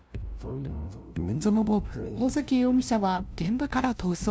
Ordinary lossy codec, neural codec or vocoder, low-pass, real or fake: none; codec, 16 kHz, 0.5 kbps, FunCodec, trained on LibriTTS, 25 frames a second; none; fake